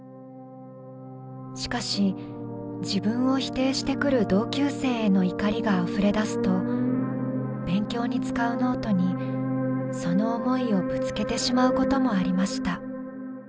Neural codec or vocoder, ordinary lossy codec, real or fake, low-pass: none; none; real; none